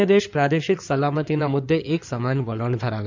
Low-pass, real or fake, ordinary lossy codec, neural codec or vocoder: 7.2 kHz; fake; MP3, 64 kbps; codec, 16 kHz in and 24 kHz out, 2.2 kbps, FireRedTTS-2 codec